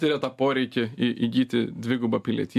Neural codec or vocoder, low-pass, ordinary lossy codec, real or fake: none; 14.4 kHz; MP3, 96 kbps; real